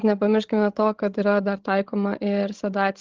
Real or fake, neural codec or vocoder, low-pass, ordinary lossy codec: fake; codec, 16 kHz, 16 kbps, FreqCodec, larger model; 7.2 kHz; Opus, 16 kbps